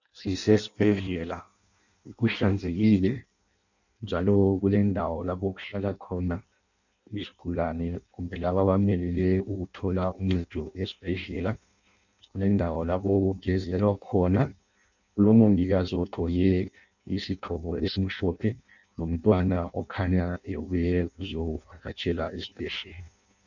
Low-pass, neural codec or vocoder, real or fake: 7.2 kHz; codec, 16 kHz in and 24 kHz out, 0.6 kbps, FireRedTTS-2 codec; fake